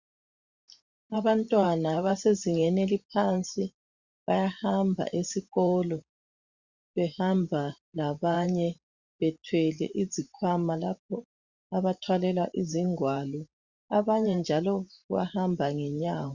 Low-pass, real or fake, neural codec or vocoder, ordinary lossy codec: 7.2 kHz; fake; vocoder, 44.1 kHz, 128 mel bands every 512 samples, BigVGAN v2; Opus, 64 kbps